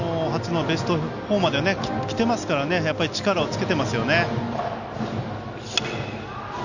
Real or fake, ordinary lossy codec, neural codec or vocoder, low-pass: real; none; none; 7.2 kHz